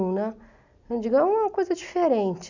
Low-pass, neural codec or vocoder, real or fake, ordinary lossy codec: 7.2 kHz; none; real; none